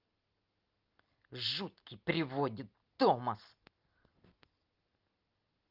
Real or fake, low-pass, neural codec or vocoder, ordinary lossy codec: real; 5.4 kHz; none; Opus, 32 kbps